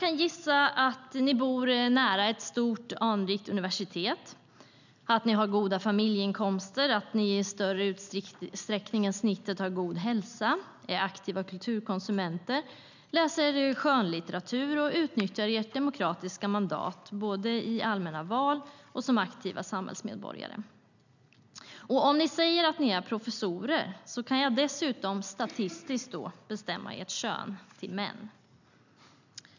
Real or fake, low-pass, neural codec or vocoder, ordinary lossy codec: real; 7.2 kHz; none; none